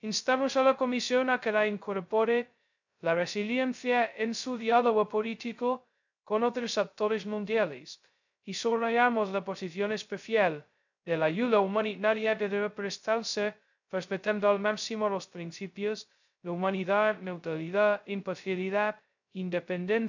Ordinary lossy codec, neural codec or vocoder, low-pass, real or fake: none; codec, 16 kHz, 0.2 kbps, FocalCodec; 7.2 kHz; fake